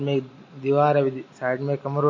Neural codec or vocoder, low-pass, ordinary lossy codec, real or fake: none; 7.2 kHz; MP3, 32 kbps; real